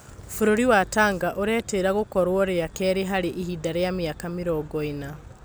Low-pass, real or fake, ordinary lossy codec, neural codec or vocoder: none; real; none; none